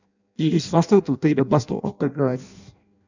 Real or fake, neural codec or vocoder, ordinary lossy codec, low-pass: fake; codec, 16 kHz in and 24 kHz out, 0.6 kbps, FireRedTTS-2 codec; none; 7.2 kHz